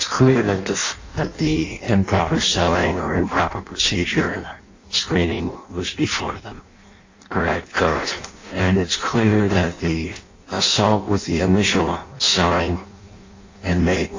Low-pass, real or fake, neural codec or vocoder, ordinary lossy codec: 7.2 kHz; fake; codec, 16 kHz in and 24 kHz out, 0.6 kbps, FireRedTTS-2 codec; AAC, 48 kbps